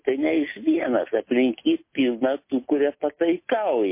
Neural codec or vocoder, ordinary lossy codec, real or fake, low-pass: none; MP3, 24 kbps; real; 3.6 kHz